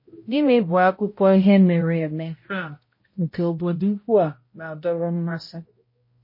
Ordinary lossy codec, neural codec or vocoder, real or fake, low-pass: MP3, 24 kbps; codec, 16 kHz, 0.5 kbps, X-Codec, HuBERT features, trained on balanced general audio; fake; 5.4 kHz